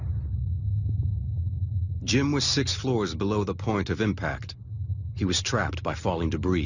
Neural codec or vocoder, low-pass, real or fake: none; 7.2 kHz; real